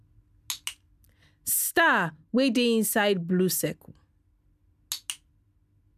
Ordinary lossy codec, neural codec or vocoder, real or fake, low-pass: none; none; real; 14.4 kHz